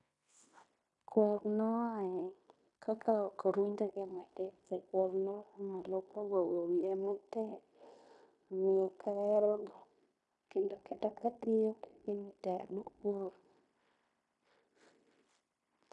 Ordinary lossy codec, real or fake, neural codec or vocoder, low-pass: none; fake; codec, 16 kHz in and 24 kHz out, 0.9 kbps, LongCat-Audio-Codec, fine tuned four codebook decoder; 10.8 kHz